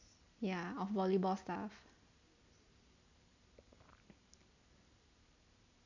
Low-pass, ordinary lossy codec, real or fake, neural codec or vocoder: 7.2 kHz; none; real; none